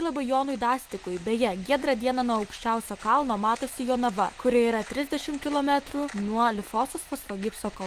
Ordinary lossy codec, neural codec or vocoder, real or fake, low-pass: Opus, 24 kbps; autoencoder, 48 kHz, 128 numbers a frame, DAC-VAE, trained on Japanese speech; fake; 14.4 kHz